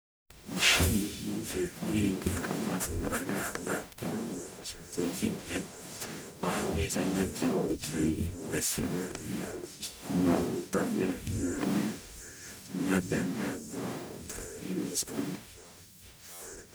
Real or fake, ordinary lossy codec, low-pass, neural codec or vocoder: fake; none; none; codec, 44.1 kHz, 0.9 kbps, DAC